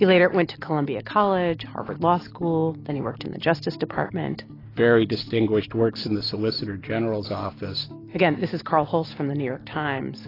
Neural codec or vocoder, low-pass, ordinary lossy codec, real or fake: none; 5.4 kHz; AAC, 24 kbps; real